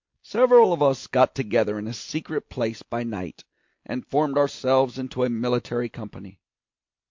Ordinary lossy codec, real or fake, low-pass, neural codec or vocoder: MP3, 48 kbps; real; 7.2 kHz; none